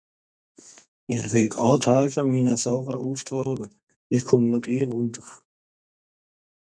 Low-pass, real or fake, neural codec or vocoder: 9.9 kHz; fake; codec, 32 kHz, 1.9 kbps, SNAC